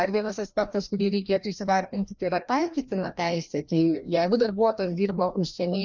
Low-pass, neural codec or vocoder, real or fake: 7.2 kHz; codec, 16 kHz, 1 kbps, FreqCodec, larger model; fake